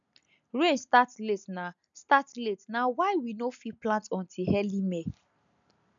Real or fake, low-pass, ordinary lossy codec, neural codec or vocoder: real; 7.2 kHz; none; none